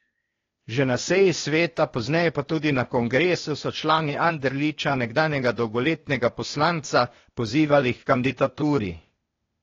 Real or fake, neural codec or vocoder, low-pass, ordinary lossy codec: fake; codec, 16 kHz, 0.8 kbps, ZipCodec; 7.2 kHz; AAC, 32 kbps